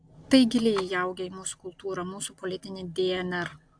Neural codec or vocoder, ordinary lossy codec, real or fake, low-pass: none; AAC, 64 kbps; real; 9.9 kHz